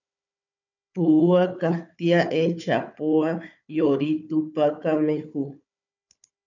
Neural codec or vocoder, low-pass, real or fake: codec, 16 kHz, 4 kbps, FunCodec, trained on Chinese and English, 50 frames a second; 7.2 kHz; fake